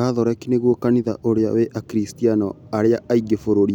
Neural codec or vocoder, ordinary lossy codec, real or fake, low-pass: none; none; real; 19.8 kHz